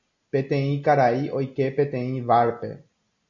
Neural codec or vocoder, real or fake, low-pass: none; real; 7.2 kHz